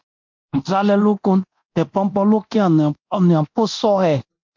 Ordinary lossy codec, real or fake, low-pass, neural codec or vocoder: MP3, 48 kbps; fake; 7.2 kHz; codec, 24 kHz, 0.9 kbps, DualCodec